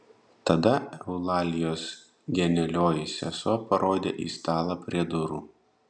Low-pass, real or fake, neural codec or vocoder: 9.9 kHz; real; none